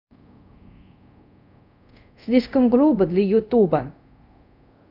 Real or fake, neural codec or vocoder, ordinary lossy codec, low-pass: fake; codec, 24 kHz, 0.5 kbps, DualCodec; none; 5.4 kHz